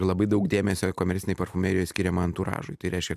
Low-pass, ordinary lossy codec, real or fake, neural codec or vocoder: 14.4 kHz; AAC, 96 kbps; real; none